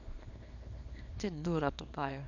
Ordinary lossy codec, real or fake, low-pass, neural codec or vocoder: none; fake; 7.2 kHz; codec, 24 kHz, 0.9 kbps, WavTokenizer, small release